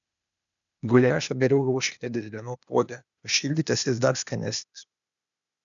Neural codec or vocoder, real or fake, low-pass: codec, 16 kHz, 0.8 kbps, ZipCodec; fake; 7.2 kHz